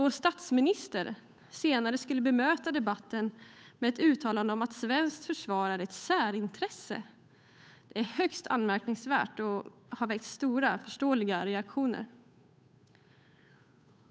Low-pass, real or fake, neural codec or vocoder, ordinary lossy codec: none; fake; codec, 16 kHz, 8 kbps, FunCodec, trained on Chinese and English, 25 frames a second; none